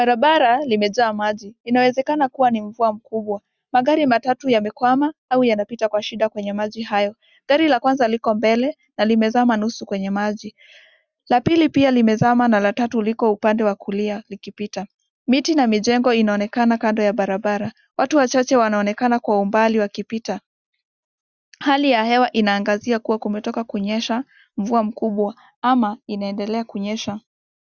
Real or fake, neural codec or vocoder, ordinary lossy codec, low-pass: real; none; Opus, 64 kbps; 7.2 kHz